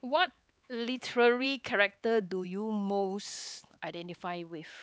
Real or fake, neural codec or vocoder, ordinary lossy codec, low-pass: fake; codec, 16 kHz, 4 kbps, X-Codec, HuBERT features, trained on LibriSpeech; none; none